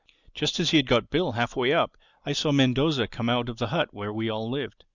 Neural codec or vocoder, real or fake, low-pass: none; real; 7.2 kHz